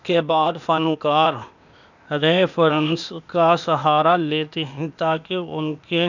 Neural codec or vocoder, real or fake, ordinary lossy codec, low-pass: codec, 16 kHz, 0.8 kbps, ZipCodec; fake; none; 7.2 kHz